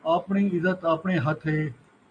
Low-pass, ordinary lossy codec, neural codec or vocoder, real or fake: 9.9 kHz; AAC, 64 kbps; none; real